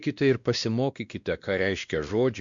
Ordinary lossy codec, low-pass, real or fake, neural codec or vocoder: Opus, 64 kbps; 7.2 kHz; fake; codec, 16 kHz, 1 kbps, X-Codec, WavLM features, trained on Multilingual LibriSpeech